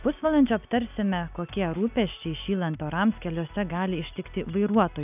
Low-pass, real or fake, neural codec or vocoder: 3.6 kHz; real; none